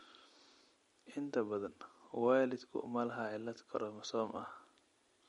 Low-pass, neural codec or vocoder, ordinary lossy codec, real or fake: 19.8 kHz; none; MP3, 48 kbps; real